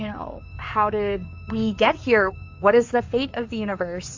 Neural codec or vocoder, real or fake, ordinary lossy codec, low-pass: codec, 16 kHz in and 24 kHz out, 2.2 kbps, FireRedTTS-2 codec; fake; AAC, 48 kbps; 7.2 kHz